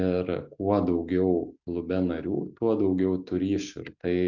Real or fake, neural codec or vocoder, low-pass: real; none; 7.2 kHz